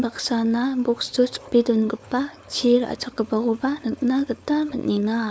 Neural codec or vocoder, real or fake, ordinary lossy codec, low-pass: codec, 16 kHz, 4.8 kbps, FACodec; fake; none; none